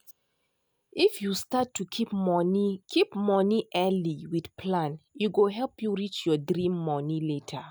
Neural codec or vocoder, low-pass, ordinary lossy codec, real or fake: none; none; none; real